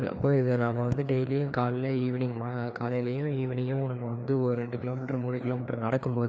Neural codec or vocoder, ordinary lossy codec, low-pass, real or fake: codec, 16 kHz, 2 kbps, FreqCodec, larger model; none; none; fake